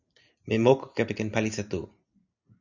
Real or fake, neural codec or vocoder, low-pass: real; none; 7.2 kHz